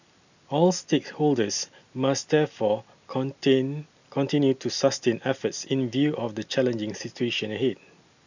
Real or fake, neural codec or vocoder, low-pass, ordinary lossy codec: real; none; 7.2 kHz; none